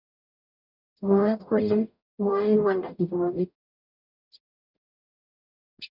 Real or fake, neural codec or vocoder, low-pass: fake; codec, 44.1 kHz, 0.9 kbps, DAC; 5.4 kHz